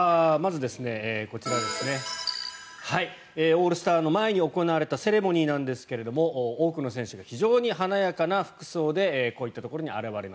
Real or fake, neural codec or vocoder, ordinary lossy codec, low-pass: real; none; none; none